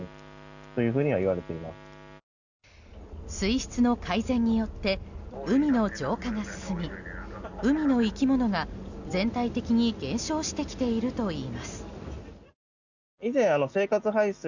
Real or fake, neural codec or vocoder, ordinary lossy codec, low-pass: real; none; none; 7.2 kHz